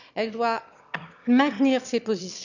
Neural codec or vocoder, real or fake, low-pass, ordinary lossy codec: autoencoder, 22.05 kHz, a latent of 192 numbers a frame, VITS, trained on one speaker; fake; 7.2 kHz; none